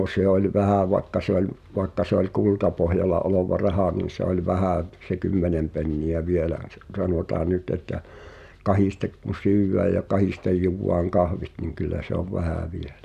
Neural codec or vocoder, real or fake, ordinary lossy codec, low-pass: none; real; none; 14.4 kHz